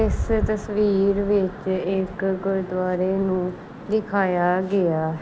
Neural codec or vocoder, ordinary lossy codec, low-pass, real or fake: none; none; none; real